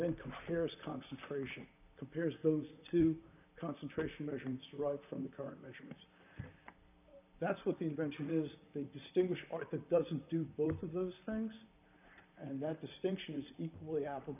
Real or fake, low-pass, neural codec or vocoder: fake; 3.6 kHz; vocoder, 44.1 kHz, 128 mel bands, Pupu-Vocoder